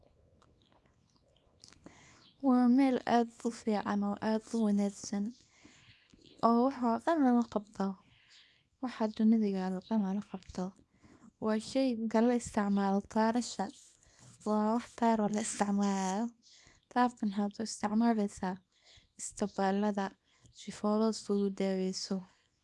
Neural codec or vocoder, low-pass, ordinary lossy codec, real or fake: codec, 24 kHz, 0.9 kbps, WavTokenizer, small release; none; none; fake